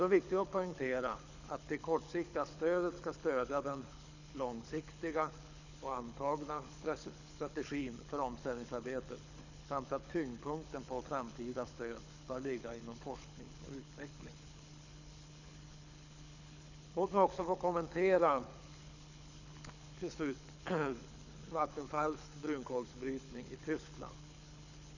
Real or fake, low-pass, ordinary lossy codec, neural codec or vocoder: fake; 7.2 kHz; none; codec, 24 kHz, 6 kbps, HILCodec